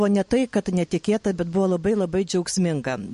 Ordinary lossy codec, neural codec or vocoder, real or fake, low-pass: MP3, 48 kbps; none; real; 14.4 kHz